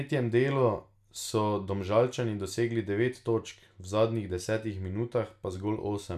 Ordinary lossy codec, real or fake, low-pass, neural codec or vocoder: none; real; 14.4 kHz; none